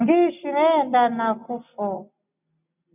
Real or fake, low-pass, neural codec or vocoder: real; 3.6 kHz; none